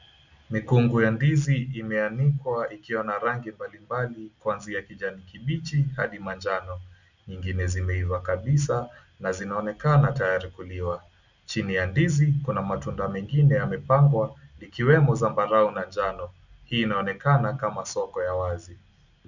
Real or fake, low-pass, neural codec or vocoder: real; 7.2 kHz; none